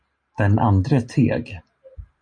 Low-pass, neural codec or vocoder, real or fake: 9.9 kHz; none; real